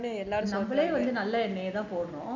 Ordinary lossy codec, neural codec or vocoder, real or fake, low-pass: none; none; real; 7.2 kHz